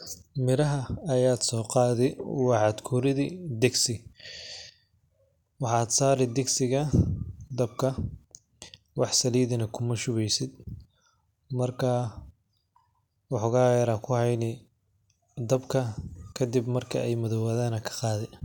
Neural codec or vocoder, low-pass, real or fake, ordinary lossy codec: none; 19.8 kHz; real; none